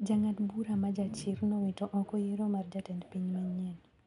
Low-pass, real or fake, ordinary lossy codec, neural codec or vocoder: 10.8 kHz; real; none; none